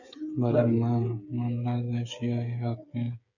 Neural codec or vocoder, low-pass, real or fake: codec, 16 kHz, 8 kbps, FreqCodec, smaller model; 7.2 kHz; fake